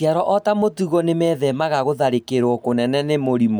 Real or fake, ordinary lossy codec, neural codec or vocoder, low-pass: real; none; none; none